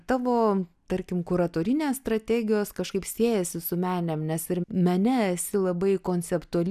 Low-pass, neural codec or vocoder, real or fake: 14.4 kHz; none; real